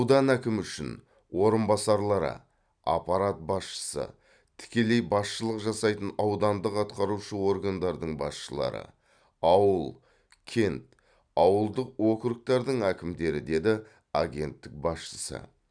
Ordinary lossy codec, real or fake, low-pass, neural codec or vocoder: none; real; 9.9 kHz; none